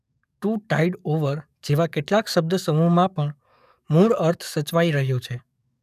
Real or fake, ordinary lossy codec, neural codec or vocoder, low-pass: fake; none; codec, 44.1 kHz, 7.8 kbps, DAC; 14.4 kHz